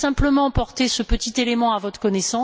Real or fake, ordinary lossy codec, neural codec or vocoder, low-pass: real; none; none; none